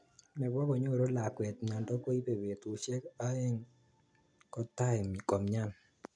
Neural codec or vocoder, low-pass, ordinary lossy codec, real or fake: none; 9.9 kHz; none; real